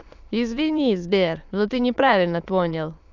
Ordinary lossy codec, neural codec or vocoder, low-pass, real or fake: none; autoencoder, 22.05 kHz, a latent of 192 numbers a frame, VITS, trained on many speakers; 7.2 kHz; fake